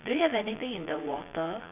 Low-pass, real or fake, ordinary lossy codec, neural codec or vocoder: 3.6 kHz; fake; none; vocoder, 22.05 kHz, 80 mel bands, Vocos